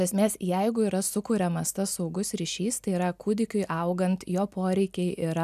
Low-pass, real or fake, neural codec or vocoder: 14.4 kHz; real; none